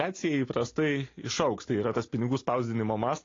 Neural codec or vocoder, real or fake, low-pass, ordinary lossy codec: none; real; 7.2 kHz; AAC, 32 kbps